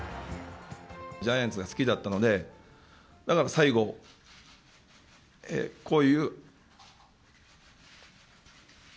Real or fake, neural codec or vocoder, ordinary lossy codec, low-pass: real; none; none; none